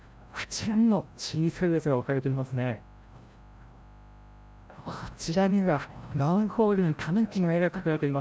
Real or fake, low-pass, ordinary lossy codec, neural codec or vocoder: fake; none; none; codec, 16 kHz, 0.5 kbps, FreqCodec, larger model